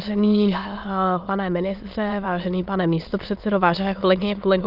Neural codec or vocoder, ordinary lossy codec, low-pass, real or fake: autoencoder, 22.05 kHz, a latent of 192 numbers a frame, VITS, trained on many speakers; Opus, 24 kbps; 5.4 kHz; fake